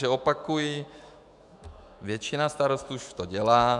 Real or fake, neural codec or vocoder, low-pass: fake; autoencoder, 48 kHz, 128 numbers a frame, DAC-VAE, trained on Japanese speech; 10.8 kHz